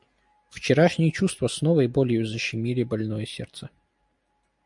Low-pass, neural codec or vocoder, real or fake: 10.8 kHz; none; real